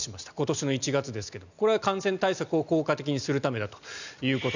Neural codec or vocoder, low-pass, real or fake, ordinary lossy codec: none; 7.2 kHz; real; none